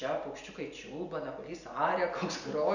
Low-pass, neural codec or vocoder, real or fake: 7.2 kHz; none; real